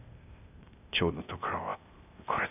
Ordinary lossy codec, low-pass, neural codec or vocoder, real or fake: none; 3.6 kHz; codec, 16 kHz, 0.8 kbps, ZipCodec; fake